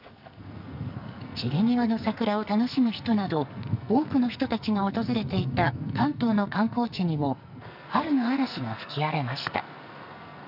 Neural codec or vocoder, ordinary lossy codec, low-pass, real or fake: codec, 44.1 kHz, 2.6 kbps, SNAC; none; 5.4 kHz; fake